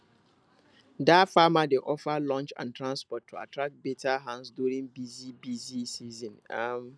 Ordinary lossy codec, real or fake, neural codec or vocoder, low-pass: none; real; none; none